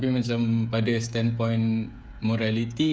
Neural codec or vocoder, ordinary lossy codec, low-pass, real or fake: codec, 16 kHz, 16 kbps, FreqCodec, smaller model; none; none; fake